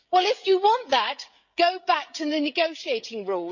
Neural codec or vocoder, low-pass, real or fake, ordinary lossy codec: codec, 16 kHz, 16 kbps, FreqCodec, smaller model; 7.2 kHz; fake; none